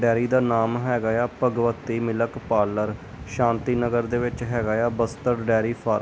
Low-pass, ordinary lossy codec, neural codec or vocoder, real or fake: none; none; none; real